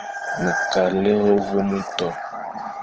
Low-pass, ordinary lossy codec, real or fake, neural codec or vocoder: 7.2 kHz; Opus, 16 kbps; fake; codec, 16 kHz, 16 kbps, FunCodec, trained on LibriTTS, 50 frames a second